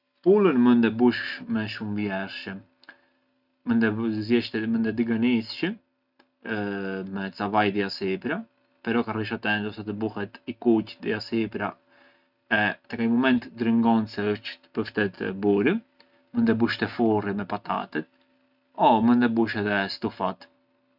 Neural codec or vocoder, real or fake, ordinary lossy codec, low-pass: none; real; none; 5.4 kHz